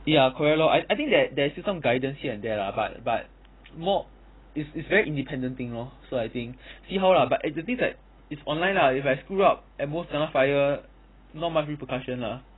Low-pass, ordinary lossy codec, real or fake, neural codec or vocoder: 7.2 kHz; AAC, 16 kbps; real; none